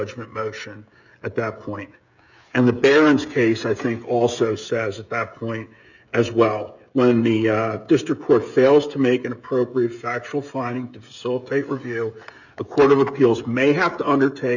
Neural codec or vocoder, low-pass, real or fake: codec, 16 kHz, 16 kbps, FreqCodec, smaller model; 7.2 kHz; fake